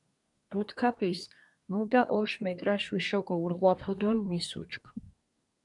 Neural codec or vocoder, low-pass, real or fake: codec, 24 kHz, 1 kbps, SNAC; 10.8 kHz; fake